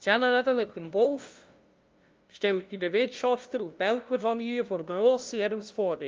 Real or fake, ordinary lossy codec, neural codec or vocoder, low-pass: fake; Opus, 24 kbps; codec, 16 kHz, 0.5 kbps, FunCodec, trained on LibriTTS, 25 frames a second; 7.2 kHz